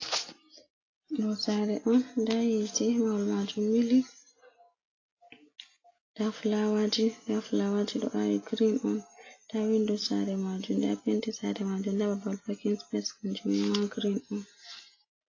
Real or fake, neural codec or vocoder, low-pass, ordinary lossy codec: real; none; 7.2 kHz; AAC, 32 kbps